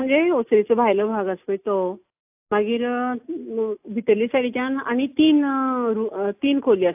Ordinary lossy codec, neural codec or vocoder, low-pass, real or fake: AAC, 32 kbps; none; 3.6 kHz; real